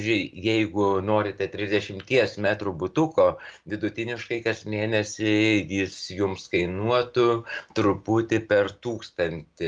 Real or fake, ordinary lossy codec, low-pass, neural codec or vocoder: real; Opus, 32 kbps; 9.9 kHz; none